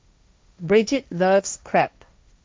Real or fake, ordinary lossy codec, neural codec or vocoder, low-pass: fake; none; codec, 16 kHz, 1.1 kbps, Voila-Tokenizer; none